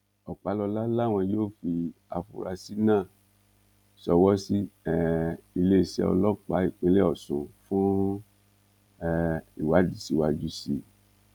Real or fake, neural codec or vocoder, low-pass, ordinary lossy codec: fake; vocoder, 44.1 kHz, 128 mel bands every 256 samples, BigVGAN v2; 19.8 kHz; none